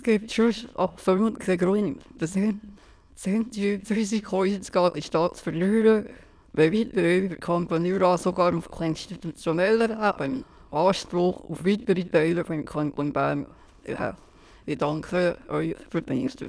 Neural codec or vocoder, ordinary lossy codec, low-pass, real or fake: autoencoder, 22.05 kHz, a latent of 192 numbers a frame, VITS, trained on many speakers; none; none; fake